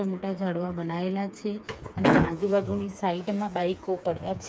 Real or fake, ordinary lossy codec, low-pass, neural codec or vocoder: fake; none; none; codec, 16 kHz, 4 kbps, FreqCodec, smaller model